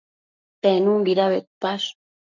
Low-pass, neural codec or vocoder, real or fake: 7.2 kHz; codec, 44.1 kHz, 7.8 kbps, Pupu-Codec; fake